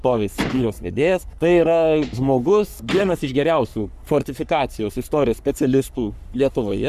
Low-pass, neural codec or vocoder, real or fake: 14.4 kHz; codec, 44.1 kHz, 3.4 kbps, Pupu-Codec; fake